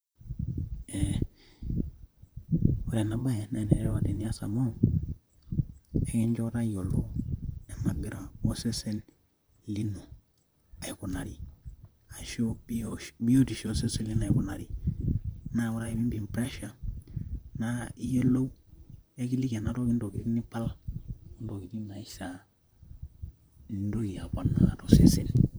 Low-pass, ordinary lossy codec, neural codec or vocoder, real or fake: none; none; vocoder, 44.1 kHz, 128 mel bands, Pupu-Vocoder; fake